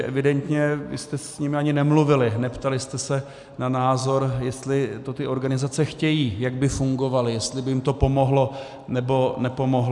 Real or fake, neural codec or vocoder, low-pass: real; none; 10.8 kHz